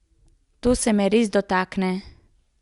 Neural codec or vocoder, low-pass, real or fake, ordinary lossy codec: none; 10.8 kHz; real; Opus, 64 kbps